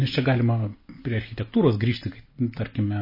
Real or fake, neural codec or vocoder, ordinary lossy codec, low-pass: real; none; MP3, 24 kbps; 5.4 kHz